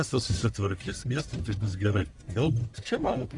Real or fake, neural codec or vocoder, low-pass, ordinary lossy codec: fake; codec, 44.1 kHz, 1.7 kbps, Pupu-Codec; 10.8 kHz; MP3, 96 kbps